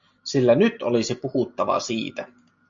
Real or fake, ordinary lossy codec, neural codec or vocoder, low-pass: real; MP3, 48 kbps; none; 7.2 kHz